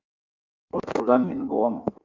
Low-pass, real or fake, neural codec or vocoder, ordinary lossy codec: 7.2 kHz; fake; codec, 16 kHz in and 24 kHz out, 1.1 kbps, FireRedTTS-2 codec; Opus, 32 kbps